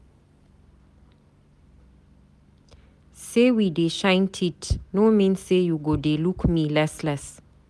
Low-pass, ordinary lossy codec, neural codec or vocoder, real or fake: none; none; none; real